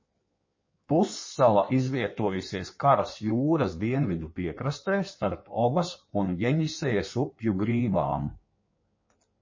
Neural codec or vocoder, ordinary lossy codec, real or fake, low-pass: codec, 16 kHz in and 24 kHz out, 1.1 kbps, FireRedTTS-2 codec; MP3, 32 kbps; fake; 7.2 kHz